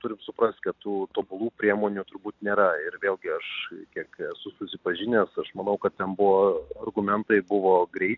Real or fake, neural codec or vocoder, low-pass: real; none; 7.2 kHz